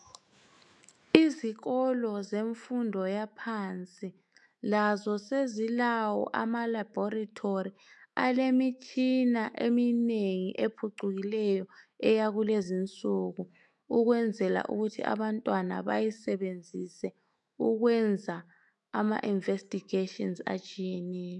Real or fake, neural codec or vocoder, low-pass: fake; autoencoder, 48 kHz, 128 numbers a frame, DAC-VAE, trained on Japanese speech; 10.8 kHz